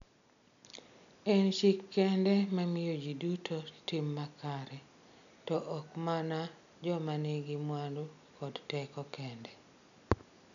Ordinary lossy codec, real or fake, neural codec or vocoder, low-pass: none; real; none; 7.2 kHz